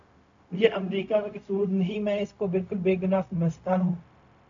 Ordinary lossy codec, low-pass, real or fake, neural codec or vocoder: MP3, 64 kbps; 7.2 kHz; fake; codec, 16 kHz, 0.4 kbps, LongCat-Audio-Codec